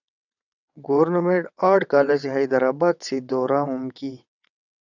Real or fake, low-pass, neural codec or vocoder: fake; 7.2 kHz; vocoder, 22.05 kHz, 80 mel bands, WaveNeXt